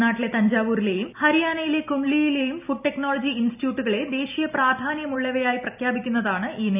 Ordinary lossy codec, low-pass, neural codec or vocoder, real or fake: none; 3.6 kHz; none; real